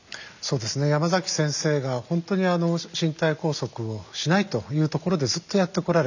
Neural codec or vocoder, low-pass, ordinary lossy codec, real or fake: none; 7.2 kHz; none; real